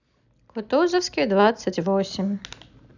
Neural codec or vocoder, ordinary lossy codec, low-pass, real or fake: none; none; 7.2 kHz; real